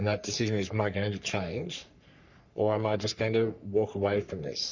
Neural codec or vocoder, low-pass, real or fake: codec, 44.1 kHz, 3.4 kbps, Pupu-Codec; 7.2 kHz; fake